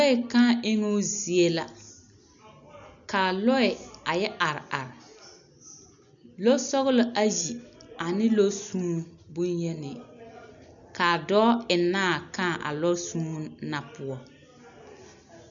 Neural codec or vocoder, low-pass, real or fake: none; 7.2 kHz; real